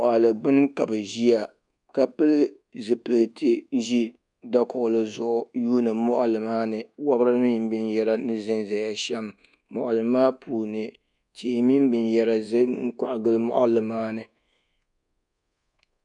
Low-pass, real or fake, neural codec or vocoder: 10.8 kHz; fake; codec, 24 kHz, 1.2 kbps, DualCodec